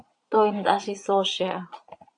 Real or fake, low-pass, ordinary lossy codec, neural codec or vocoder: fake; 9.9 kHz; AAC, 64 kbps; vocoder, 22.05 kHz, 80 mel bands, Vocos